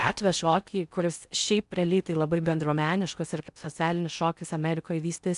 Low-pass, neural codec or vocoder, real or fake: 10.8 kHz; codec, 16 kHz in and 24 kHz out, 0.6 kbps, FocalCodec, streaming, 4096 codes; fake